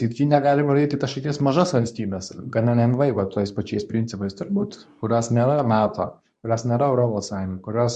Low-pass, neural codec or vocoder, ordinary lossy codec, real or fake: 10.8 kHz; codec, 24 kHz, 0.9 kbps, WavTokenizer, medium speech release version 2; MP3, 64 kbps; fake